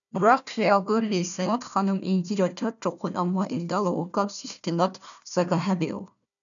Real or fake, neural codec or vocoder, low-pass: fake; codec, 16 kHz, 1 kbps, FunCodec, trained on Chinese and English, 50 frames a second; 7.2 kHz